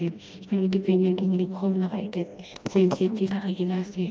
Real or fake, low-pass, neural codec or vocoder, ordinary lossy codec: fake; none; codec, 16 kHz, 1 kbps, FreqCodec, smaller model; none